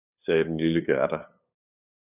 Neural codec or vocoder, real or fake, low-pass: codec, 16 kHz, 8 kbps, FunCodec, trained on LibriTTS, 25 frames a second; fake; 3.6 kHz